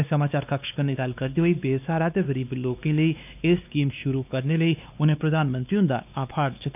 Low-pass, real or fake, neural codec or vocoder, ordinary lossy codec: 3.6 kHz; fake; codec, 16 kHz, 2 kbps, X-Codec, WavLM features, trained on Multilingual LibriSpeech; none